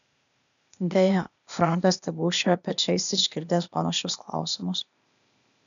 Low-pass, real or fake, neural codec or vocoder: 7.2 kHz; fake; codec, 16 kHz, 0.8 kbps, ZipCodec